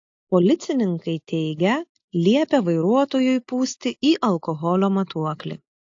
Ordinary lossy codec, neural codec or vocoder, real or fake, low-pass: AAC, 48 kbps; none; real; 7.2 kHz